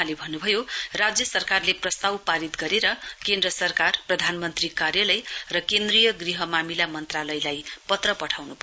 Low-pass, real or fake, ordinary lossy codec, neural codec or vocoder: none; real; none; none